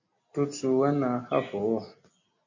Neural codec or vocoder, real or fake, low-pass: none; real; 7.2 kHz